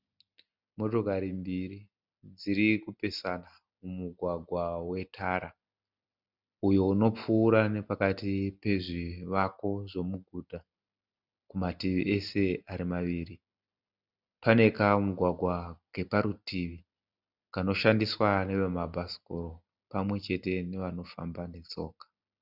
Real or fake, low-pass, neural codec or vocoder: real; 5.4 kHz; none